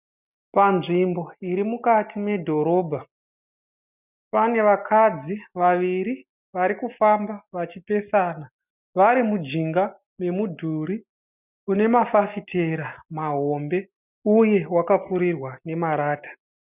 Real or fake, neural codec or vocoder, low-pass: real; none; 3.6 kHz